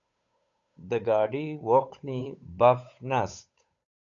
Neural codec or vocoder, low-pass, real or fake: codec, 16 kHz, 8 kbps, FunCodec, trained on Chinese and English, 25 frames a second; 7.2 kHz; fake